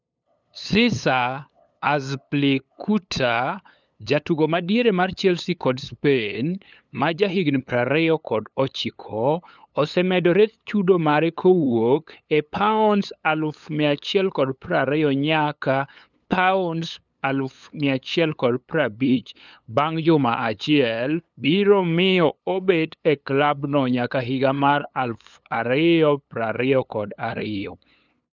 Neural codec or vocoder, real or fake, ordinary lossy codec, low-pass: codec, 16 kHz, 8 kbps, FunCodec, trained on LibriTTS, 25 frames a second; fake; none; 7.2 kHz